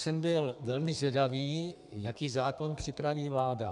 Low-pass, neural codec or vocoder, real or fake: 10.8 kHz; codec, 32 kHz, 1.9 kbps, SNAC; fake